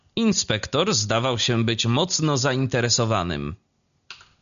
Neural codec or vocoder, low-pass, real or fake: none; 7.2 kHz; real